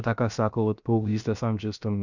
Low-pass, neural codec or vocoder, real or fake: 7.2 kHz; codec, 16 kHz, 0.7 kbps, FocalCodec; fake